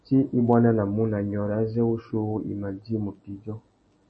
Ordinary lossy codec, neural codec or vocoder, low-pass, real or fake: MP3, 32 kbps; none; 10.8 kHz; real